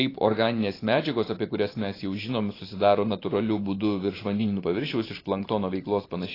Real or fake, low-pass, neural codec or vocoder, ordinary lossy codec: fake; 5.4 kHz; vocoder, 44.1 kHz, 128 mel bands every 512 samples, BigVGAN v2; AAC, 24 kbps